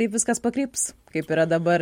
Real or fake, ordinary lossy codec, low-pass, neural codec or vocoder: real; MP3, 64 kbps; 19.8 kHz; none